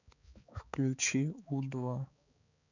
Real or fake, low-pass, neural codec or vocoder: fake; 7.2 kHz; codec, 16 kHz, 4 kbps, X-Codec, HuBERT features, trained on balanced general audio